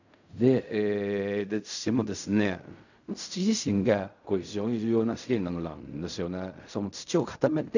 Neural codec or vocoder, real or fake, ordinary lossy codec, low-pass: codec, 16 kHz in and 24 kHz out, 0.4 kbps, LongCat-Audio-Codec, fine tuned four codebook decoder; fake; none; 7.2 kHz